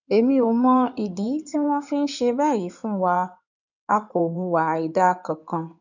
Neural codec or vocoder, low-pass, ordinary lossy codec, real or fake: codec, 16 kHz in and 24 kHz out, 2.2 kbps, FireRedTTS-2 codec; 7.2 kHz; none; fake